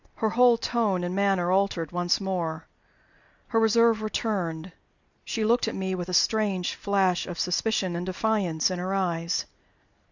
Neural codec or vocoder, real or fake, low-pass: none; real; 7.2 kHz